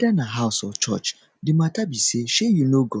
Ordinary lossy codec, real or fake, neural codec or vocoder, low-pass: none; real; none; none